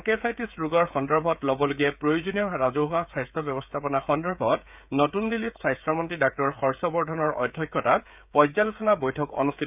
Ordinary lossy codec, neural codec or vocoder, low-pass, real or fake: none; codec, 16 kHz, 16 kbps, FreqCodec, smaller model; 3.6 kHz; fake